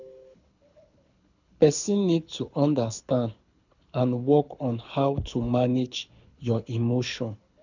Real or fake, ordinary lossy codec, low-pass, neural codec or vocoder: fake; none; 7.2 kHz; codec, 24 kHz, 6 kbps, HILCodec